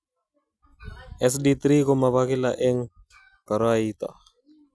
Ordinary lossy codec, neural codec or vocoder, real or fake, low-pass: none; none; real; none